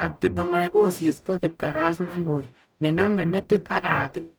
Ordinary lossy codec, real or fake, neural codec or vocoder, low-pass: none; fake; codec, 44.1 kHz, 0.9 kbps, DAC; none